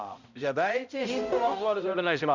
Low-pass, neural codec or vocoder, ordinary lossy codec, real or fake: 7.2 kHz; codec, 16 kHz, 0.5 kbps, X-Codec, HuBERT features, trained on balanced general audio; none; fake